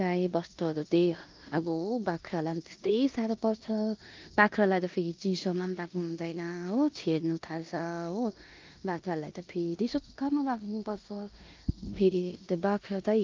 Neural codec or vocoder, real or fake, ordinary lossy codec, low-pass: codec, 16 kHz in and 24 kHz out, 0.9 kbps, LongCat-Audio-Codec, four codebook decoder; fake; Opus, 32 kbps; 7.2 kHz